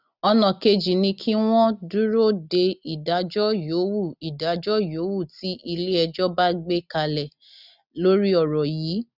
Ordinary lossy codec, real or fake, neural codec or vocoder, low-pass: none; real; none; 5.4 kHz